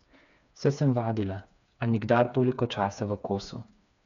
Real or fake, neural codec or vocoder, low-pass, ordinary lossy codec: fake; codec, 16 kHz, 4 kbps, FreqCodec, smaller model; 7.2 kHz; MP3, 96 kbps